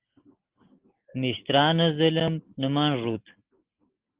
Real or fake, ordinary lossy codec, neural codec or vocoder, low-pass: real; Opus, 16 kbps; none; 3.6 kHz